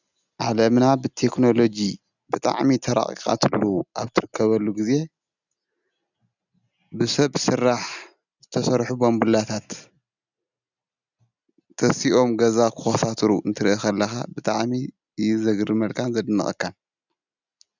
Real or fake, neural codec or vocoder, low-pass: real; none; 7.2 kHz